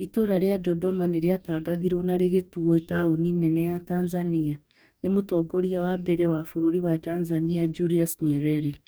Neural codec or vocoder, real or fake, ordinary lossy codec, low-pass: codec, 44.1 kHz, 2.6 kbps, DAC; fake; none; none